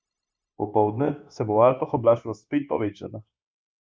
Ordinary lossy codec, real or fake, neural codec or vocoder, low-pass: none; fake; codec, 16 kHz, 0.9 kbps, LongCat-Audio-Codec; none